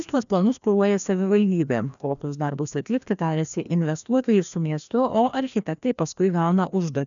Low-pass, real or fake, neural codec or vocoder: 7.2 kHz; fake; codec, 16 kHz, 1 kbps, FreqCodec, larger model